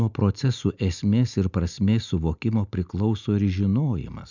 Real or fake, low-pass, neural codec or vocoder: real; 7.2 kHz; none